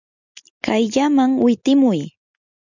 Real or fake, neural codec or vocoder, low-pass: real; none; 7.2 kHz